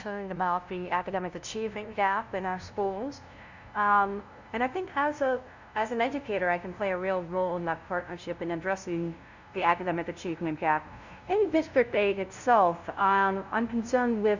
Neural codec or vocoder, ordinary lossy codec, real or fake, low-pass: codec, 16 kHz, 0.5 kbps, FunCodec, trained on LibriTTS, 25 frames a second; Opus, 64 kbps; fake; 7.2 kHz